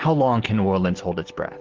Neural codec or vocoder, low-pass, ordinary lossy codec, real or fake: none; 7.2 kHz; Opus, 16 kbps; real